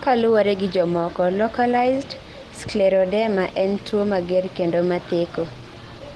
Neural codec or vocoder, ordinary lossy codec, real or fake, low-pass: none; Opus, 16 kbps; real; 10.8 kHz